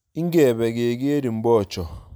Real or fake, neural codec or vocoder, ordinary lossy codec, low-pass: real; none; none; none